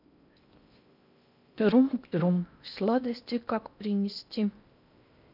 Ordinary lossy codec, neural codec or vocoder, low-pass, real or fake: none; codec, 16 kHz in and 24 kHz out, 0.6 kbps, FocalCodec, streaming, 4096 codes; 5.4 kHz; fake